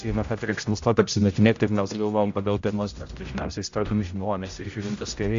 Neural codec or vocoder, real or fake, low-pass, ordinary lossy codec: codec, 16 kHz, 0.5 kbps, X-Codec, HuBERT features, trained on general audio; fake; 7.2 kHz; AAC, 64 kbps